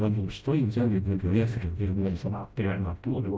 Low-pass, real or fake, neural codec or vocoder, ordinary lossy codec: none; fake; codec, 16 kHz, 0.5 kbps, FreqCodec, smaller model; none